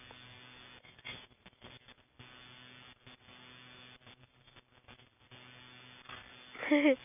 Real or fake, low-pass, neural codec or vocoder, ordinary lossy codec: real; 3.6 kHz; none; none